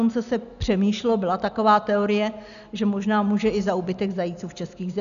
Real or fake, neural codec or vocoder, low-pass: real; none; 7.2 kHz